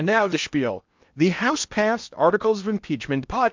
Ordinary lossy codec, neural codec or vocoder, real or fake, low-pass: MP3, 64 kbps; codec, 16 kHz in and 24 kHz out, 0.8 kbps, FocalCodec, streaming, 65536 codes; fake; 7.2 kHz